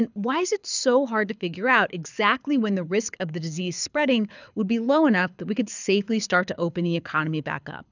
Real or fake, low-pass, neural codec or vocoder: fake; 7.2 kHz; codec, 24 kHz, 6 kbps, HILCodec